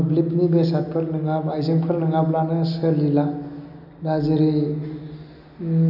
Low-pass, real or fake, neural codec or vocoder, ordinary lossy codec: 5.4 kHz; real; none; none